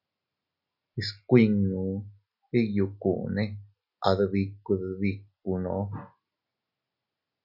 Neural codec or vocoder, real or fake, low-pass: none; real; 5.4 kHz